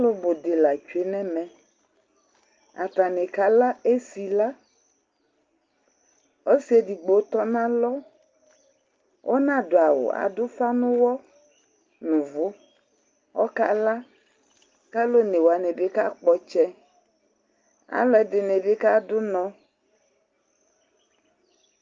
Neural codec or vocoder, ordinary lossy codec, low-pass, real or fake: none; Opus, 24 kbps; 7.2 kHz; real